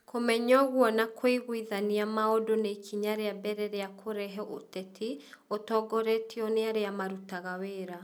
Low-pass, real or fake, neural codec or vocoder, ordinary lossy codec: none; real; none; none